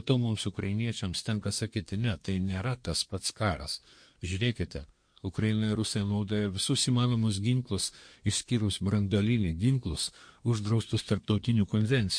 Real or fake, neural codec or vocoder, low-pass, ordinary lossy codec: fake; codec, 24 kHz, 1 kbps, SNAC; 9.9 kHz; MP3, 48 kbps